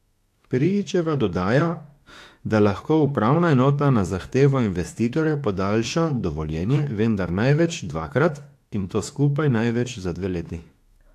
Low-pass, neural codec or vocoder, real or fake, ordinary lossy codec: 14.4 kHz; autoencoder, 48 kHz, 32 numbers a frame, DAC-VAE, trained on Japanese speech; fake; AAC, 64 kbps